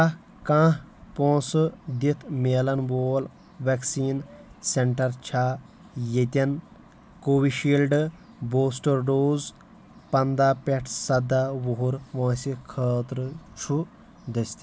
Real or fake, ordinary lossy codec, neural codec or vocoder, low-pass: real; none; none; none